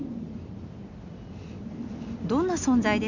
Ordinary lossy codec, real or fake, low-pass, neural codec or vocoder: none; real; 7.2 kHz; none